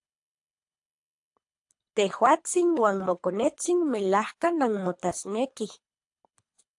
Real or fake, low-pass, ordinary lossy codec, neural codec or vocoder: fake; 10.8 kHz; AAC, 64 kbps; codec, 24 kHz, 3 kbps, HILCodec